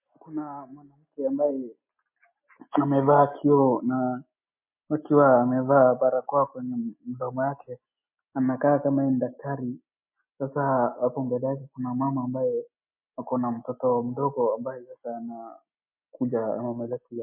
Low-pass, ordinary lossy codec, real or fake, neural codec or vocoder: 3.6 kHz; MP3, 24 kbps; real; none